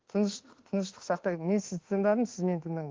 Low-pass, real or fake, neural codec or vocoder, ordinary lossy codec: 7.2 kHz; fake; autoencoder, 48 kHz, 32 numbers a frame, DAC-VAE, trained on Japanese speech; Opus, 16 kbps